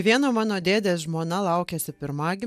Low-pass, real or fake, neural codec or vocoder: 14.4 kHz; real; none